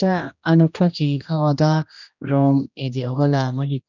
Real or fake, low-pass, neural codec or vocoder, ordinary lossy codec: fake; 7.2 kHz; codec, 16 kHz, 1 kbps, X-Codec, HuBERT features, trained on general audio; none